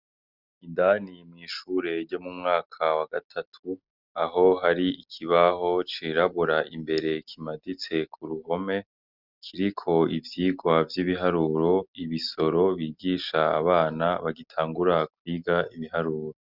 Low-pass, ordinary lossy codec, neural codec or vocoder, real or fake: 5.4 kHz; Opus, 64 kbps; none; real